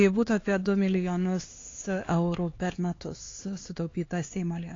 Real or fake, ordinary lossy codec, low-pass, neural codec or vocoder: fake; AAC, 32 kbps; 7.2 kHz; codec, 16 kHz, 4 kbps, X-Codec, HuBERT features, trained on LibriSpeech